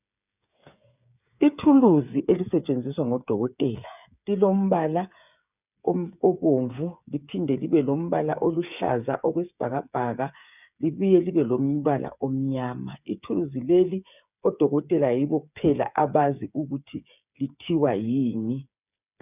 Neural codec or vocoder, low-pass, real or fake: codec, 16 kHz, 8 kbps, FreqCodec, smaller model; 3.6 kHz; fake